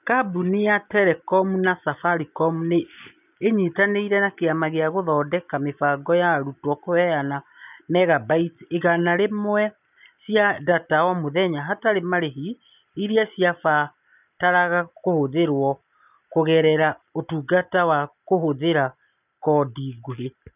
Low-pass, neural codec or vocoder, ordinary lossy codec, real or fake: 3.6 kHz; none; none; real